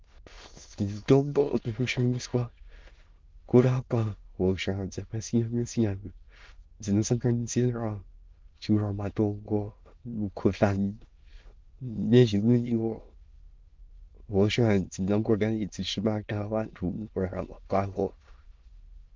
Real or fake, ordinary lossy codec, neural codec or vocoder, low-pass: fake; Opus, 24 kbps; autoencoder, 22.05 kHz, a latent of 192 numbers a frame, VITS, trained on many speakers; 7.2 kHz